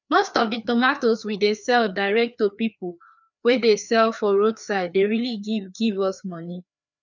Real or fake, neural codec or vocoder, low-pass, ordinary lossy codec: fake; codec, 16 kHz, 2 kbps, FreqCodec, larger model; 7.2 kHz; none